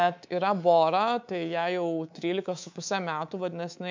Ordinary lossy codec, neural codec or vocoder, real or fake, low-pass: MP3, 64 kbps; codec, 24 kHz, 3.1 kbps, DualCodec; fake; 7.2 kHz